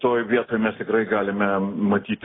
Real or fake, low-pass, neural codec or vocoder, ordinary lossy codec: real; 7.2 kHz; none; AAC, 16 kbps